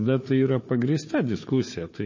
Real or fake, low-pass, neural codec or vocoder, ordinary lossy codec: fake; 7.2 kHz; codec, 16 kHz, 4 kbps, FunCodec, trained on Chinese and English, 50 frames a second; MP3, 32 kbps